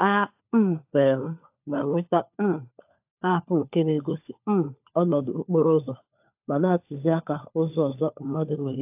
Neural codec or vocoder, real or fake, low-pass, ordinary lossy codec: codec, 16 kHz, 4 kbps, FunCodec, trained on LibriTTS, 50 frames a second; fake; 3.6 kHz; AAC, 32 kbps